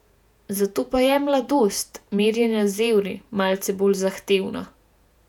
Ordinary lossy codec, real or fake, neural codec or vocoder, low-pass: none; fake; vocoder, 48 kHz, 128 mel bands, Vocos; 19.8 kHz